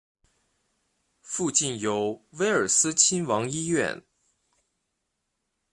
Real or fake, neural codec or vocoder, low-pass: real; none; 10.8 kHz